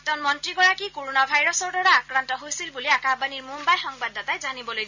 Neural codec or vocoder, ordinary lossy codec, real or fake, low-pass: none; none; real; 7.2 kHz